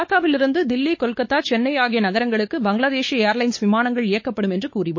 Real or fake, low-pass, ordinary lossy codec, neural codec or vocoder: fake; 7.2 kHz; MP3, 32 kbps; codec, 16 kHz, 4 kbps, X-Codec, WavLM features, trained on Multilingual LibriSpeech